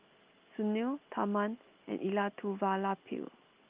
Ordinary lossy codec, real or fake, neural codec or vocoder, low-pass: Opus, 24 kbps; real; none; 3.6 kHz